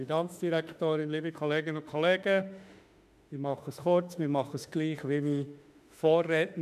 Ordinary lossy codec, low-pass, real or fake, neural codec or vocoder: none; 14.4 kHz; fake; autoencoder, 48 kHz, 32 numbers a frame, DAC-VAE, trained on Japanese speech